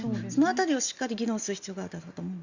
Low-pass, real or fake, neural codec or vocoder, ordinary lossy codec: 7.2 kHz; real; none; Opus, 64 kbps